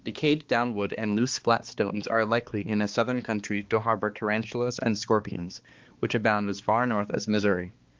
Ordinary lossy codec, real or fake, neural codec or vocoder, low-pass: Opus, 32 kbps; fake; codec, 16 kHz, 2 kbps, X-Codec, HuBERT features, trained on balanced general audio; 7.2 kHz